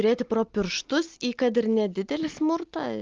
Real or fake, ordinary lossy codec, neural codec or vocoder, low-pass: real; Opus, 32 kbps; none; 7.2 kHz